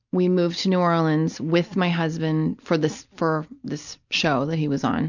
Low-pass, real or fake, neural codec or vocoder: 7.2 kHz; real; none